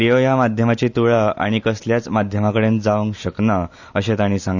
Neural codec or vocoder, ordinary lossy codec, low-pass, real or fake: none; none; 7.2 kHz; real